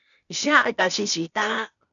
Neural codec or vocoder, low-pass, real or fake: codec, 16 kHz, 0.8 kbps, ZipCodec; 7.2 kHz; fake